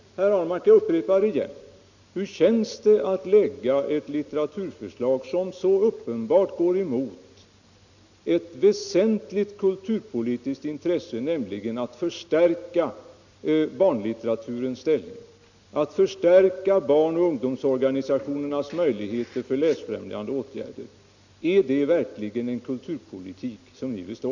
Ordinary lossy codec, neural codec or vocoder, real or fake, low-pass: none; none; real; 7.2 kHz